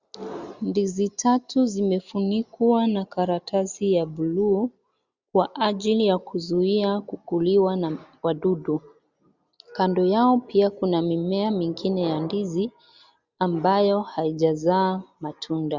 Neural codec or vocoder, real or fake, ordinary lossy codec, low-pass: none; real; Opus, 64 kbps; 7.2 kHz